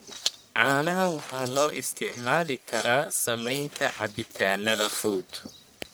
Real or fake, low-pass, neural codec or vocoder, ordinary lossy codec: fake; none; codec, 44.1 kHz, 1.7 kbps, Pupu-Codec; none